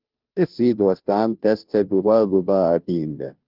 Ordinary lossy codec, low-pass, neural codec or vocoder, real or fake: Opus, 24 kbps; 5.4 kHz; codec, 16 kHz, 0.5 kbps, FunCodec, trained on Chinese and English, 25 frames a second; fake